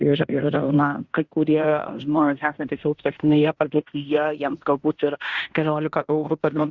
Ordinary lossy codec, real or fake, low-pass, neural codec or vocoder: Opus, 64 kbps; fake; 7.2 kHz; codec, 16 kHz in and 24 kHz out, 0.9 kbps, LongCat-Audio-Codec, fine tuned four codebook decoder